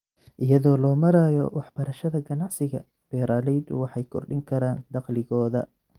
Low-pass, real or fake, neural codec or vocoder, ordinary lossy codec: 19.8 kHz; real; none; Opus, 24 kbps